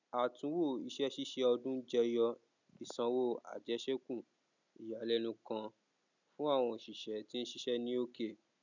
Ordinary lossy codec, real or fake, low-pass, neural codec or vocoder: none; real; 7.2 kHz; none